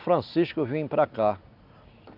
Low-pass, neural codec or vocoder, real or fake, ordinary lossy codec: 5.4 kHz; none; real; none